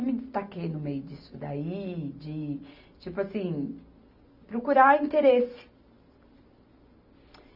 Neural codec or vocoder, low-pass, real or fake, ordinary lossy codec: none; 5.4 kHz; real; none